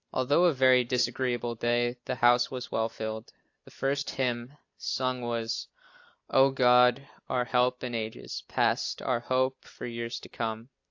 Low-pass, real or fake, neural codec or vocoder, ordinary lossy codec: 7.2 kHz; real; none; AAC, 48 kbps